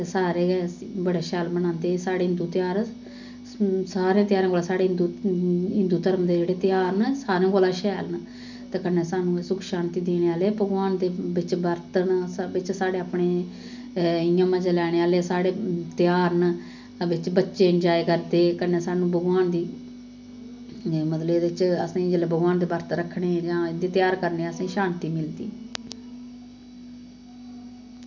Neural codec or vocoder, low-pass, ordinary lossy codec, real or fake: none; 7.2 kHz; none; real